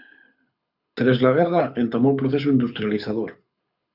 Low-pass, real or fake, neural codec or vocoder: 5.4 kHz; fake; codec, 24 kHz, 6 kbps, HILCodec